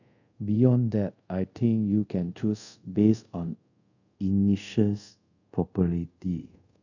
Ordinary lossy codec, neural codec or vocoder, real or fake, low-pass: none; codec, 24 kHz, 0.5 kbps, DualCodec; fake; 7.2 kHz